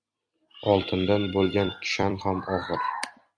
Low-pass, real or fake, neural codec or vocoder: 9.9 kHz; fake; vocoder, 44.1 kHz, 128 mel bands every 256 samples, BigVGAN v2